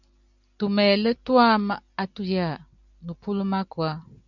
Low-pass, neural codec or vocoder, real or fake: 7.2 kHz; none; real